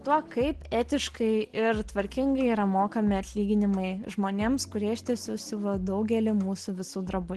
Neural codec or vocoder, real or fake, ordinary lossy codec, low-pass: none; real; Opus, 16 kbps; 10.8 kHz